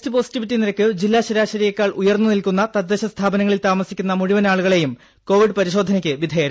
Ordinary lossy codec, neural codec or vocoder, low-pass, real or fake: none; none; none; real